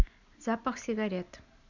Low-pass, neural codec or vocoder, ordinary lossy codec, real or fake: 7.2 kHz; none; none; real